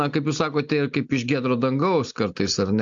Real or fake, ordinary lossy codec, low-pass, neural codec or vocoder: real; AAC, 64 kbps; 7.2 kHz; none